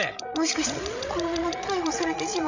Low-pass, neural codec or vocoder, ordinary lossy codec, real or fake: 7.2 kHz; codec, 16 kHz, 16 kbps, FreqCodec, larger model; Opus, 64 kbps; fake